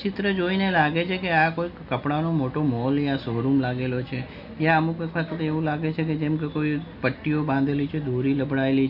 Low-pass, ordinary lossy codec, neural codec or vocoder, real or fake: 5.4 kHz; none; none; real